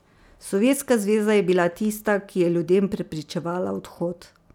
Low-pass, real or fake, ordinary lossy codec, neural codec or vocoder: 19.8 kHz; real; none; none